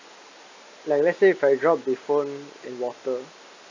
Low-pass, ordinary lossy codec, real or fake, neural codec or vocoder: 7.2 kHz; AAC, 48 kbps; real; none